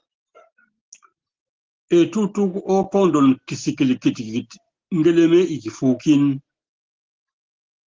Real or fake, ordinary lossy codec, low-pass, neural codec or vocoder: real; Opus, 16 kbps; 7.2 kHz; none